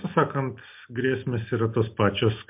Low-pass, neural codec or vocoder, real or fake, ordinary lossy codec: 3.6 kHz; none; real; MP3, 32 kbps